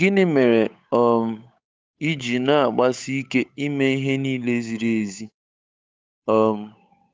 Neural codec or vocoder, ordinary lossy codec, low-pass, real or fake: codec, 16 kHz, 8 kbps, FunCodec, trained on Chinese and English, 25 frames a second; none; none; fake